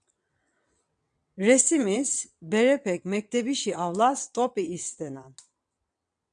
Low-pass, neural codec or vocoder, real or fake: 9.9 kHz; vocoder, 22.05 kHz, 80 mel bands, WaveNeXt; fake